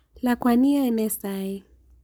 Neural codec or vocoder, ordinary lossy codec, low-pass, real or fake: codec, 44.1 kHz, 7.8 kbps, Pupu-Codec; none; none; fake